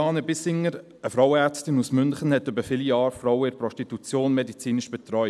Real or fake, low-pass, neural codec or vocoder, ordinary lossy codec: real; none; none; none